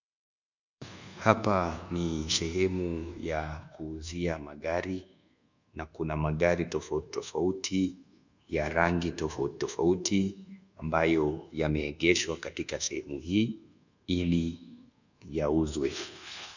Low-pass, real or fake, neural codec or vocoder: 7.2 kHz; fake; codec, 24 kHz, 1.2 kbps, DualCodec